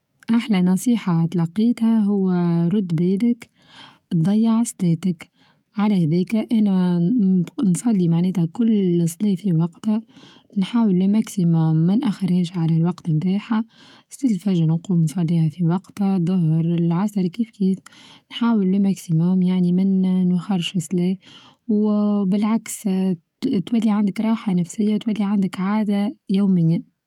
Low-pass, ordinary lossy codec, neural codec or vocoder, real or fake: 19.8 kHz; none; codec, 44.1 kHz, 7.8 kbps, DAC; fake